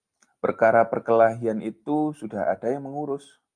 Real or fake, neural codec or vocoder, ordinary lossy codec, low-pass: real; none; Opus, 32 kbps; 9.9 kHz